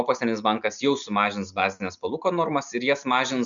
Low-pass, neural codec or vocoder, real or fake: 7.2 kHz; none; real